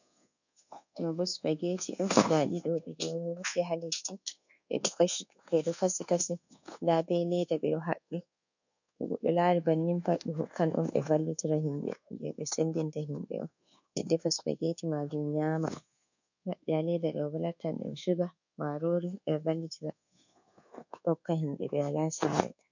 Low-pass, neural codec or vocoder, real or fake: 7.2 kHz; codec, 24 kHz, 1.2 kbps, DualCodec; fake